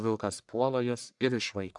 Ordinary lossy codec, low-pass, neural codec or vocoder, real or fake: MP3, 96 kbps; 10.8 kHz; codec, 44.1 kHz, 1.7 kbps, Pupu-Codec; fake